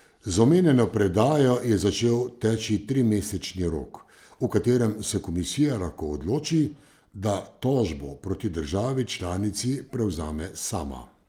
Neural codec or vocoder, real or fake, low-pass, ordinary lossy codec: none; real; 19.8 kHz; Opus, 32 kbps